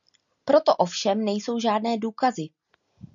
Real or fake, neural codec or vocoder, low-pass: real; none; 7.2 kHz